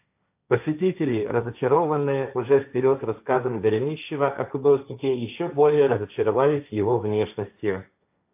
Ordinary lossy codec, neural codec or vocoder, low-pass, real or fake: AAC, 32 kbps; codec, 16 kHz, 1.1 kbps, Voila-Tokenizer; 3.6 kHz; fake